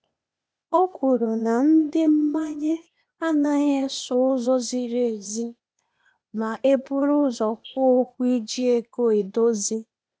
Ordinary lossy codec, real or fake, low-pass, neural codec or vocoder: none; fake; none; codec, 16 kHz, 0.8 kbps, ZipCodec